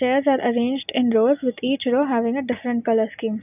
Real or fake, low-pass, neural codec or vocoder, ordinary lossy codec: real; 3.6 kHz; none; none